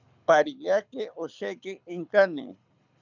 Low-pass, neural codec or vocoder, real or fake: 7.2 kHz; codec, 24 kHz, 6 kbps, HILCodec; fake